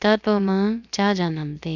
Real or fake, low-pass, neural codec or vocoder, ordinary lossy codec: fake; 7.2 kHz; codec, 16 kHz, about 1 kbps, DyCAST, with the encoder's durations; none